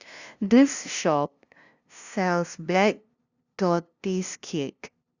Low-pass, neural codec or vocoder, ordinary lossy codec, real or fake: 7.2 kHz; codec, 16 kHz, 0.5 kbps, FunCodec, trained on LibriTTS, 25 frames a second; Opus, 64 kbps; fake